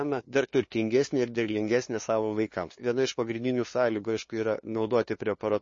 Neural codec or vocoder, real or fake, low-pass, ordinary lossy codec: codec, 16 kHz, 2 kbps, FunCodec, trained on LibriTTS, 25 frames a second; fake; 7.2 kHz; MP3, 32 kbps